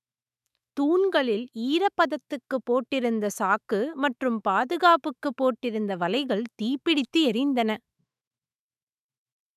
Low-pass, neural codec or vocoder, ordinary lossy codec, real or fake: 14.4 kHz; autoencoder, 48 kHz, 128 numbers a frame, DAC-VAE, trained on Japanese speech; none; fake